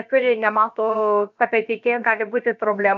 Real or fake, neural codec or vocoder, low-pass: fake; codec, 16 kHz, about 1 kbps, DyCAST, with the encoder's durations; 7.2 kHz